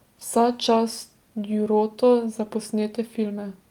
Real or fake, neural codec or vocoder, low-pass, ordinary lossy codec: real; none; 19.8 kHz; Opus, 32 kbps